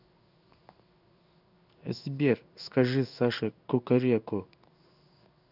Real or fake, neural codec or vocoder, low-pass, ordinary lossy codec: fake; codec, 16 kHz in and 24 kHz out, 1 kbps, XY-Tokenizer; 5.4 kHz; none